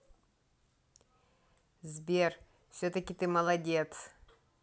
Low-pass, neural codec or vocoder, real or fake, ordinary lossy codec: none; none; real; none